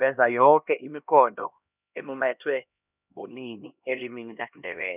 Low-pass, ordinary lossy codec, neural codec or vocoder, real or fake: 3.6 kHz; none; codec, 16 kHz, 1 kbps, X-Codec, HuBERT features, trained on LibriSpeech; fake